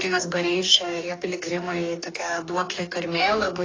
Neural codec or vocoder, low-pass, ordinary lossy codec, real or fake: codec, 44.1 kHz, 2.6 kbps, DAC; 7.2 kHz; AAC, 32 kbps; fake